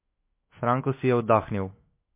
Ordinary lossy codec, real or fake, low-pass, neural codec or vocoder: MP3, 24 kbps; fake; 3.6 kHz; autoencoder, 48 kHz, 128 numbers a frame, DAC-VAE, trained on Japanese speech